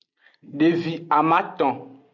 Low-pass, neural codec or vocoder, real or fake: 7.2 kHz; none; real